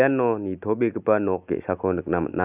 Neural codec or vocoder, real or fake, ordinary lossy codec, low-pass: none; real; none; 3.6 kHz